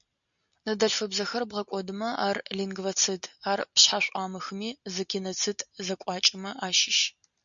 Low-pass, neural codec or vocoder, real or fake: 7.2 kHz; none; real